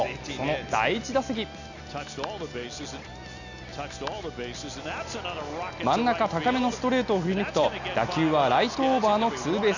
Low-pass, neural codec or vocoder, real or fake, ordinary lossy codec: 7.2 kHz; none; real; none